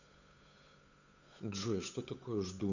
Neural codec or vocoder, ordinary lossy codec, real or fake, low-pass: codec, 16 kHz, 8 kbps, FunCodec, trained on Chinese and English, 25 frames a second; none; fake; 7.2 kHz